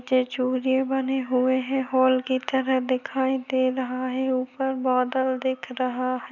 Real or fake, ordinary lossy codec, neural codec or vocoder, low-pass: real; none; none; 7.2 kHz